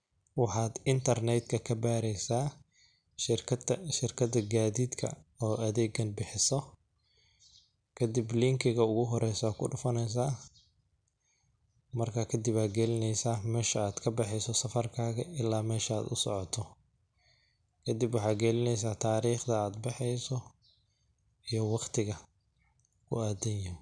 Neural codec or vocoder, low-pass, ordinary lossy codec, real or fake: none; 9.9 kHz; none; real